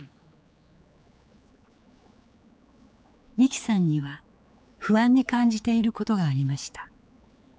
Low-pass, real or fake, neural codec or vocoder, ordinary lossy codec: none; fake; codec, 16 kHz, 4 kbps, X-Codec, HuBERT features, trained on general audio; none